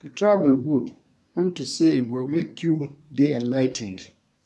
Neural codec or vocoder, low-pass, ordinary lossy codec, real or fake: codec, 24 kHz, 1 kbps, SNAC; none; none; fake